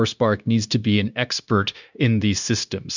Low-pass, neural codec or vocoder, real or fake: 7.2 kHz; codec, 16 kHz, 0.9 kbps, LongCat-Audio-Codec; fake